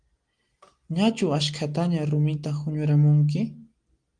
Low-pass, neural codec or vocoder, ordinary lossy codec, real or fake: 9.9 kHz; none; Opus, 24 kbps; real